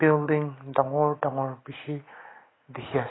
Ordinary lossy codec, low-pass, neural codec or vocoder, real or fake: AAC, 16 kbps; 7.2 kHz; none; real